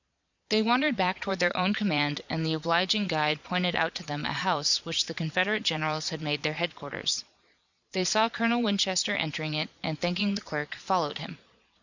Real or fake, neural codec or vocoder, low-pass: fake; vocoder, 22.05 kHz, 80 mel bands, Vocos; 7.2 kHz